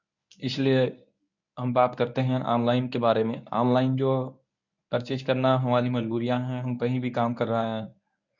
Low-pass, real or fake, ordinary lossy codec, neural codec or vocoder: 7.2 kHz; fake; none; codec, 24 kHz, 0.9 kbps, WavTokenizer, medium speech release version 1